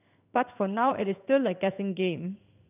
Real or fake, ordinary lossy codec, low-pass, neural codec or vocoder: fake; none; 3.6 kHz; codec, 16 kHz in and 24 kHz out, 1 kbps, XY-Tokenizer